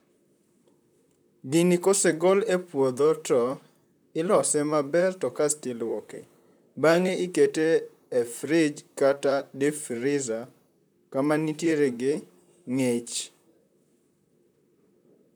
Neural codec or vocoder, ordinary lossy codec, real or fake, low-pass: vocoder, 44.1 kHz, 128 mel bands, Pupu-Vocoder; none; fake; none